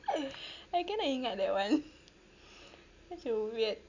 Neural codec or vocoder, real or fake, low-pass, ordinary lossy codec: none; real; 7.2 kHz; none